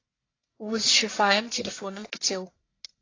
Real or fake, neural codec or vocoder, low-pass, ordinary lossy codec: fake; codec, 44.1 kHz, 1.7 kbps, Pupu-Codec; 7.2 kHz; AAC, 32 kbps